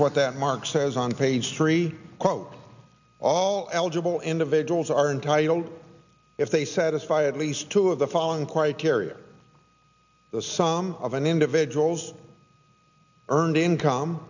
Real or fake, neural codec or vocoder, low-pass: real; none; 7.2 kHz